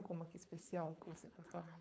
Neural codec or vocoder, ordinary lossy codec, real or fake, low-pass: codec, 16 kHz, 4.8 kbps, FACodec; none; fake; none